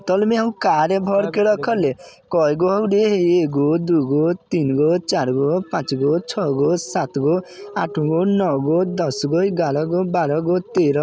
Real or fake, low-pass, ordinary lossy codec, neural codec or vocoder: real; none; none; none